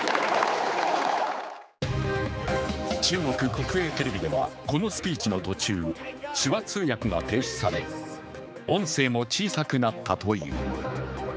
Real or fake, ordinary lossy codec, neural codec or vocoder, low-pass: fake; none; codec, 16 kHz, 4 kbps, X-Codec, HuBERT features, trained on general audio; none